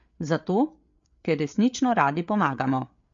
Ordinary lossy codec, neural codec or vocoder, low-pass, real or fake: MP3, 48 kbps; codec, 16 kHz, 8 kbps, FreqCodec, larger model; 7.2 kHz; fake